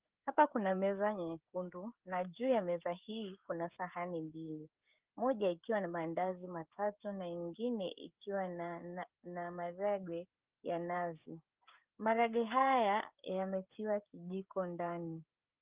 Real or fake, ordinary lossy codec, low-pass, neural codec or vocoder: fake; Opus, 32 kbps; 3.6 kHz; codec, 16 kHz, 16 kbps, FreqCodec, smaller model